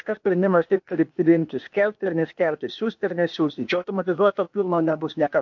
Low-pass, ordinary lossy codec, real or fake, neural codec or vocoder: 7.2 kHz; MP3, 48 kbps; fake; codec, 16 kHz, 0.8 kbps, ZipCodec